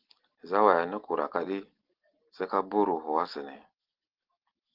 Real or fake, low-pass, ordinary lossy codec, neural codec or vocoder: real; 5.4 kHz; Opus, 16 kbps; none